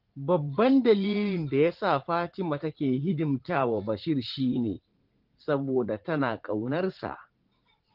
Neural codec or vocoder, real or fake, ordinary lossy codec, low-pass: vocoder, 44.1 kHz, 80 mel bands, Vocos; fake; Opus, 16 kbps; 5.4 kHz